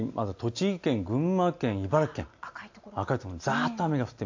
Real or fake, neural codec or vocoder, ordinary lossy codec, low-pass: real; none; AAC, 48 kbps; 7.2 kHz